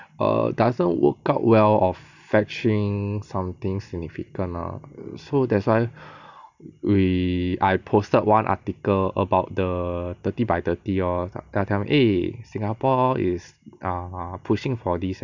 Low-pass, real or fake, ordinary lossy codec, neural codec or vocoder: 7.2 kHz; real; none; none